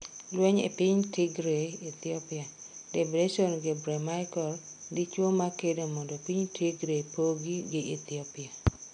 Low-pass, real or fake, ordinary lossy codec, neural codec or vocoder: 9.9 kHz; real; none; none